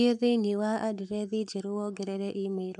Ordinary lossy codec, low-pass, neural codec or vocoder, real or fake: none; 10.8 kHz; codec, 44.1 kHz, 7.8 kbps, Pupu-Codec; fake